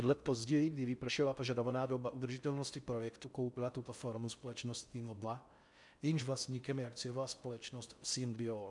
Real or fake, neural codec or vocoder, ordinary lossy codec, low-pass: fake; codec, 16 kHz in and 24 kHz out, 0.6 kbps, FocalCodec, streaming, 4096 codes; MP3, 96 kbps; 10.8 kHz